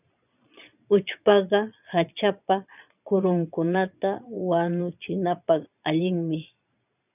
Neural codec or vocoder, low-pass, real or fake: vocoder, 22.05 kHz, 80 mel bands, Vocos; 3.6 kHz; fake